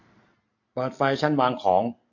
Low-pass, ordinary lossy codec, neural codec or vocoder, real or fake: 7.2 kHz; AAC, 48 kbps; none; real